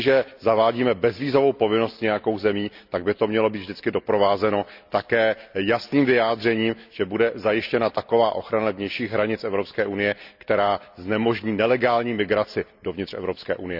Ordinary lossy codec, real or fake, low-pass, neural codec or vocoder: none; real; 5.4 kHz; none